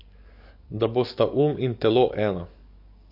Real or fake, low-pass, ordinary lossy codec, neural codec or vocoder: fake; 5.4 kHz; MP3, 32 kbps; codec, 44.1 kHz, 7.8 kbps, DAC